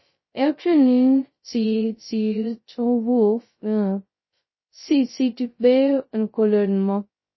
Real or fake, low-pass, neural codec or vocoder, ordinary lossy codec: fake; 7.2 kHz; codec, 16 kHz, 0.2 kbps, FocalCodec; MP3, 24 kbps